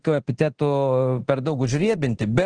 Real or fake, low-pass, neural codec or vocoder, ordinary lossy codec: fake; 9.9 kHz; codec, 24 kHz, 0.9 kbps, DualCodec; Opus, 16 kbps